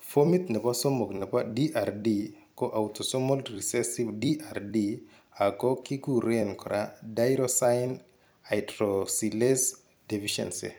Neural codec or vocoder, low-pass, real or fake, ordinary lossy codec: vocoder, 44.1 kHz, 128 mel bands every 256 samples, BigVGAN v2; none; fake; none